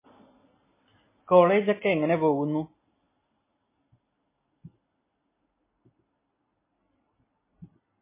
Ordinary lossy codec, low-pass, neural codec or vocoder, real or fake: MP3, 16 kbps; 3.6 kHz; none; real